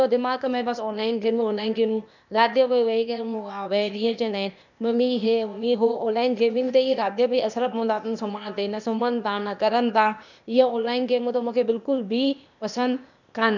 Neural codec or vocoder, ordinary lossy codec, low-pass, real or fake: codec, 16 kHz, 0.8 kbps, ZipCodec; none; 7.2 kHz; fake